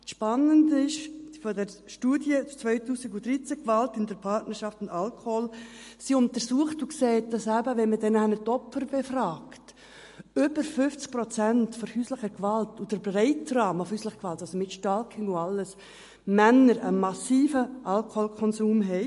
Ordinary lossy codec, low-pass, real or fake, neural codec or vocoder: MP3, 48 kbps; 14.4 kHz; real; none